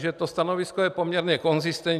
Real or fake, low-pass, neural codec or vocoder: real; 14.4 kHz; none